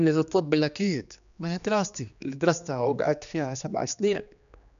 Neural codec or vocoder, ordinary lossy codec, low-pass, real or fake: codec, 16 kHz, 2 kbps, X-Codec, HuBERT features, trained on general audio; none; 7.2 kHz; fake